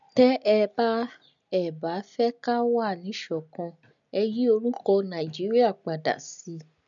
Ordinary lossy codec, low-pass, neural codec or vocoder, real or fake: MP3, 96 kbps; 7.2 kHz; none; real